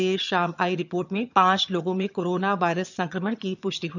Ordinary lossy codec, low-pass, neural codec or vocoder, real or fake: none; 7.2 kHz; vocoder, 22.05 kHz, 80 mel bands, HiFi-GAN; fake